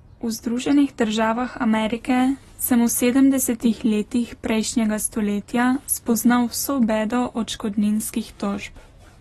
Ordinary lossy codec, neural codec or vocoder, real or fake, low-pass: AAC, 32 kbps; none; real; 19.8 kHz